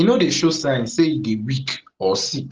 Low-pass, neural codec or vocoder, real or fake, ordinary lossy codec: 7.2 kHz; none; real; Opus, 16 kbps